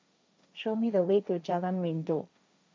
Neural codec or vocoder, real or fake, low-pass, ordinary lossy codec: codec, 16 kHz, 1.1 kbps, Voila-Tokenizer; fake; none; none